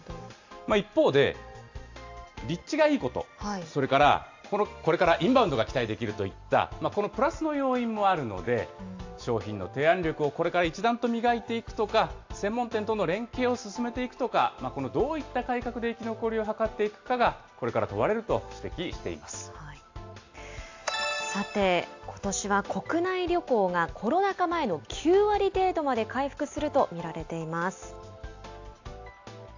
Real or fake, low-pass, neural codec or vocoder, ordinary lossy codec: real; 7.2 kHz; none; AAC, 48 kbps